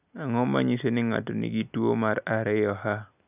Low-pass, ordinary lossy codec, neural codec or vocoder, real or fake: 3.6 kHz; none; none; real